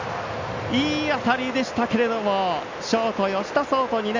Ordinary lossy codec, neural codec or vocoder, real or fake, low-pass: MP3, 64 kbps; none; real; 7.2 kHz